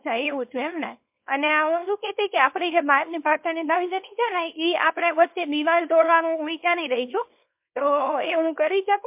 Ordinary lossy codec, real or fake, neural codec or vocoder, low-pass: MP3, 32 kbps; fake; codec, 24 kHz, 0.9 kbps, WavTokenizer, small release; 3.6 kHz